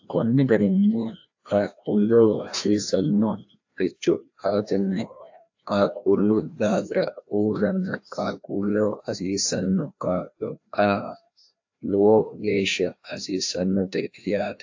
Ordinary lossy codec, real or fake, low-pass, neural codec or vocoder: AAC, 48 kbps; fake; 7.2 kHz; codec, 16 kHz, 1 kbps, FreqCodec, larger model